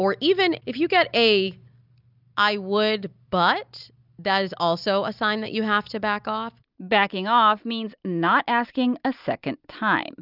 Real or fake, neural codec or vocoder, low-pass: real; none; 5.4 kHz